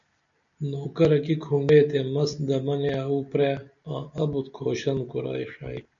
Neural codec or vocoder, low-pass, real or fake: none; 7.2 kHz; real